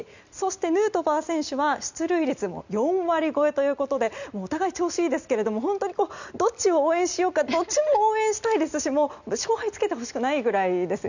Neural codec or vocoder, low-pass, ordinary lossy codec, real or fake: none; 7.2 kHz; none; real